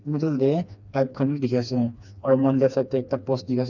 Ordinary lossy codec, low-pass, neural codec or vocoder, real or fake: none; 7.2 kHz; codec, 16 kHz, 2 kbps, FreqCodec, smaller model; fake